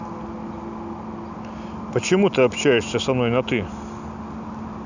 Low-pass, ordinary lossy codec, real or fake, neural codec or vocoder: 7.2 kHz; none; real; none